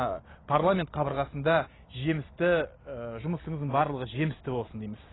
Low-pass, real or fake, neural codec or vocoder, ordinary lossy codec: 7.2 kHz; real; none; AAC, 16 kbps